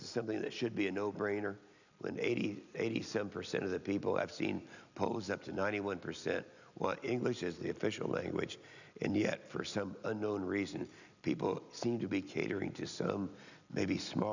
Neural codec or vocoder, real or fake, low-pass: none; real; 7.2 kHz